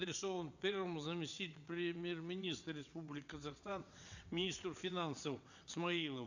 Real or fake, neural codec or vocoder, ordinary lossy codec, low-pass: fake; vocoder, 22.05 kHz, 80 mel bands, WaveNeXt; none; 7.2 kHz